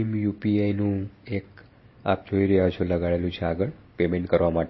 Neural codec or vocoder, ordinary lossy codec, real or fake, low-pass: none; MP3, 24 kbps; real; 7.2 kHz